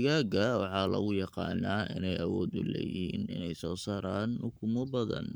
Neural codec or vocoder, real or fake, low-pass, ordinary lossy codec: codec, 44.1 kHz, 7.8 kbps, Pupu-Codec; fake; none; none